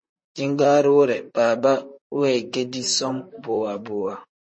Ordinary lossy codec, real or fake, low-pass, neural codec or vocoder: MP3, 32 kbps; fake; 9.9 kHz; vocoder, 44.1 kHz, 128 mel bands, Pupu-Vocoder